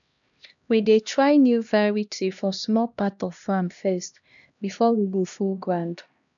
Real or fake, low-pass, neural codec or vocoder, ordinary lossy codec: fake; 7.2 kHz; codec, 16 kHz, 1 kbps, X-Codec, HuBERT features, trained on LibriSpeech; none